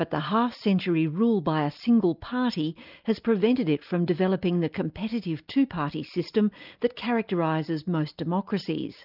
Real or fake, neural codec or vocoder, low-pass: real; none; 5.4 kHz